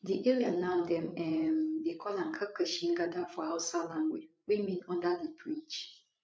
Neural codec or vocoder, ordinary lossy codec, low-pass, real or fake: codec, 16 kHz, 8 kbps, FreqCodec, larger model; none; none; fake